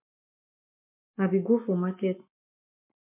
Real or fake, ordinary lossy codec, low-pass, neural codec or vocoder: fake; MP3, 32 kbps; 3.6 kHz; codec, 44.1 kHz, 7.8 kbps, Pupu-Codec